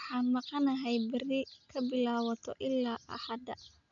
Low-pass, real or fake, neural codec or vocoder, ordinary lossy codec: 7.2 kHz; real; none; none